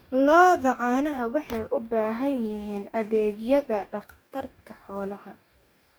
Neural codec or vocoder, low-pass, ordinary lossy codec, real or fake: codec, 44.1 kHz, 2.6 kbps, DAC; none; none; fake